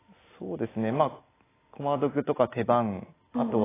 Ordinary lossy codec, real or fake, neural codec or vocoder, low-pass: AAC, 16 kbps; real; none; 3.6 kHz